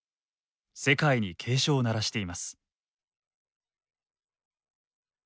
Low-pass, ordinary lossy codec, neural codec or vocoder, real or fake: none; none; none; real